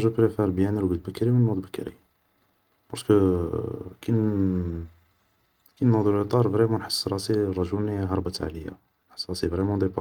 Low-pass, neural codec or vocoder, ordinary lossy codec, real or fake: 19.8 kHz; none; Opus, 32 kbps; real